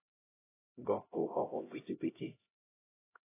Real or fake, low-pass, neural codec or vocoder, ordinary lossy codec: fake; 3.6 kHz; codec, 16 kHz, 0.5 kbps, X-Codec, HuBERT features, trained on LibriSpeech; AAC, 16 kbps